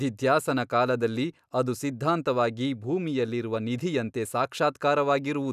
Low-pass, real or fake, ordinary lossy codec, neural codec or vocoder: 14.4 kHz; real; none; none